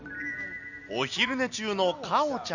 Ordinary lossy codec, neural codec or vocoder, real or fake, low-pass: none; none; real; 7.2 kHz